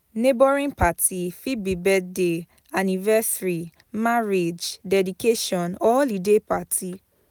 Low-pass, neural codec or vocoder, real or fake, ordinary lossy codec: none; none; real; none